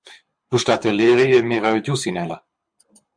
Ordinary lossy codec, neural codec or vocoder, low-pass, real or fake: MP3, 64 kbps; codec, 24 kHz, 6 kbps, HILCodec; 9.9 kHz; fake